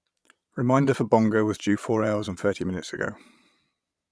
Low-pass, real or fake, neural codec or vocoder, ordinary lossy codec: none; fake; vocoder, 22.05 kHz, 80 mel bands, Vocos; none